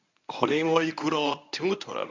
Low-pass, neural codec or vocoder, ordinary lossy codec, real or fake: 7.2 kHz; codec, 24 kHz, 0.9 kbps, WavTokenizer, medium speech release version 1; MP3, 64 kbps; fake